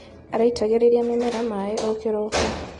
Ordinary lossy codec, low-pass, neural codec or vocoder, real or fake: AAC, 32 kbps; 10.8 kHz; none; real